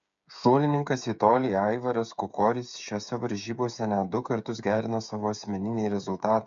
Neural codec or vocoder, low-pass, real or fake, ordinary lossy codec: codec, 16 kHz, 8 kbps, FreqCodec, smaller model; 7.2 kHz; fake; MP3, 64 kbps